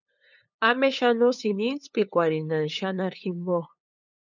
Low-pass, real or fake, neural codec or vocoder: 7.2 kHz; fake; codec, 16 kHz, 8 kbps, FunCodec, trained on LibriTTS, 25 frames a second